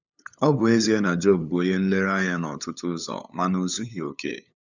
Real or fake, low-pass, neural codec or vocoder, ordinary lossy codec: fake; 7.2 kHz; codec, 16 kHz, 8 kbps, FunCodec, trained on LibriTTS, 25 frames a second; none